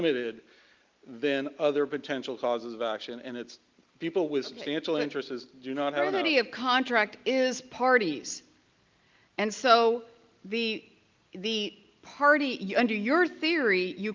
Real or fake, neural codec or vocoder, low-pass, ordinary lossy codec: real; none; 7.2 kHz; Opus, 32 kbps